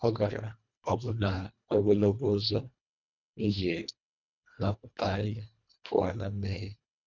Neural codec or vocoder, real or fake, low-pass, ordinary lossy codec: codec, 24 kHz, 1.5 kbps, HILCodec; fake; 7.2 kHz; none